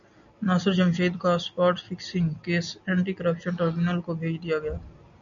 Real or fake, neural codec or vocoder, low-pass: real; none; 7.2 kHz